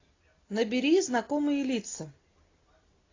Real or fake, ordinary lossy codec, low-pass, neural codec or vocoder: real; AAC, 32 kbps; 7.2 kHz; none